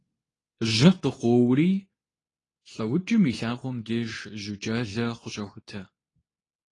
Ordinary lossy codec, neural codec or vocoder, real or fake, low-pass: AAC, 32 kbps; codec, 24 kHz, 0.9 kbps, WavTokenizer, medium speech release version 2; fake; 10.8 kHz